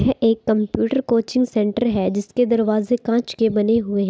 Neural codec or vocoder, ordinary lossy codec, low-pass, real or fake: none; none; none; real